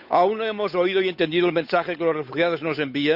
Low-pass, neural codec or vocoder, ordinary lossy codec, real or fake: 5.4 kHz; codec, 16 kHz, 8 kbps, FunCodec, trained on Chinese and English, 25 frames a second; none; fake